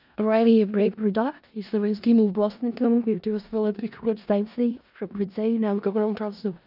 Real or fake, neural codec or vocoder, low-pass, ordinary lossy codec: fake; codec, 16 kHz in and 24 kHz out, 0.4 kbps, LongCat-Audio-Codec, four codebook decoder; 5.4 kHz; none